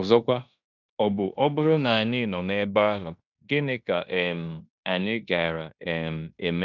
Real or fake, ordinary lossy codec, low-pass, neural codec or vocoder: fake; none; 7.2 kHz; codec, 16 kHz in and 24 kHz out, 0.9 kbps, LongCat-Audio-Codec, fine tuned four codebook decoder